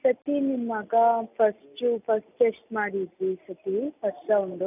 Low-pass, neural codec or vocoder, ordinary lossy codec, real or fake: 3.6 kHz; none; none; real